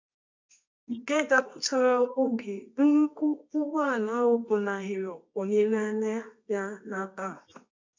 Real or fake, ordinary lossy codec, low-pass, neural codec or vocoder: fake; MP3, 64 kbps; 7.2 kHz; codec, 24 kHz, 0.9 kbps, WavTokenizer, medium music audio release